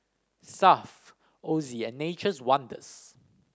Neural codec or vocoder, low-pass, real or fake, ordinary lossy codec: none; none; real; none